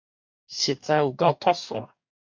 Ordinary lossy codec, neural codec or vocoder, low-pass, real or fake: MP3, 64 kbps; codec, 44.1 kHz, 2.6 kbps, DAC; 7.2 kHz; fake